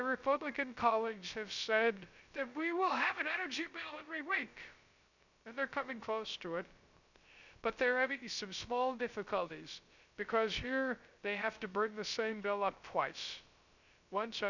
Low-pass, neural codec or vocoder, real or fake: 7.2 kHz; codec, 16 kHz, 0.3 kbps, FocalCodec; fake